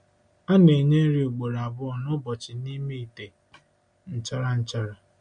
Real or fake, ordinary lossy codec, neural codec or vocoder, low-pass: real; MP3, 48 kbps; none; 9.9 kHz